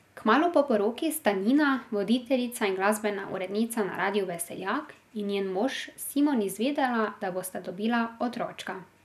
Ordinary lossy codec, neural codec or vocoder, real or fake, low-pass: none; none; real; 14.4 kHz